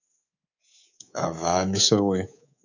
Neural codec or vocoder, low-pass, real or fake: codec, 24 kHz, 3.1 kbps, DualCodec; 7.2 kHz; fake